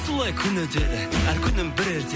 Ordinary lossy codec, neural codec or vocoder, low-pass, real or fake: none; none; none; real